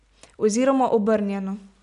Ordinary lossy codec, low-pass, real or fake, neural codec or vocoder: none; 10.8 kHz; real; none